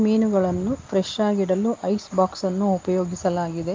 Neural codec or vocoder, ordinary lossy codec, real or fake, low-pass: none; Opus, 32 kbps; real; 7.2 kHz